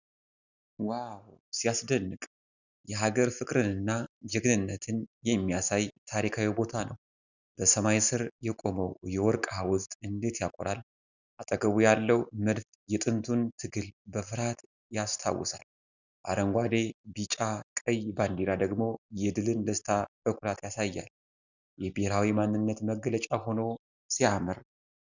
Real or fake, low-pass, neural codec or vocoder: real; 7.2 kHz; none